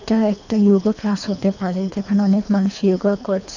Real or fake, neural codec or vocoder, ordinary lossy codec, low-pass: fake; codec, 24 kHz, 3 kbps, HILCodec; none; 7.2 kHz